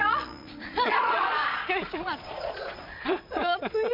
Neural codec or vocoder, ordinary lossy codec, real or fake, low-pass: vocoder, 22.05 kHz, 80 mel bands, Vocos; none; fake; 5.4 kHz